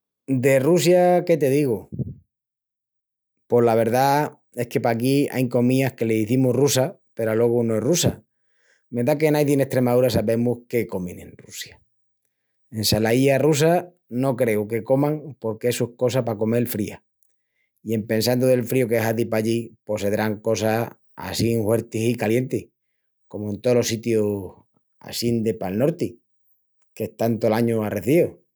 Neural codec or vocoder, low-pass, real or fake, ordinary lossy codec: none; none; real; none